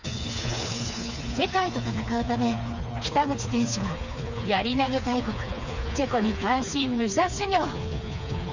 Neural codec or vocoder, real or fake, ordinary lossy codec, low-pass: codec, 16 kHz, 4 kbps, FreqCodec, smaller model; fake; none; 7.2 kHz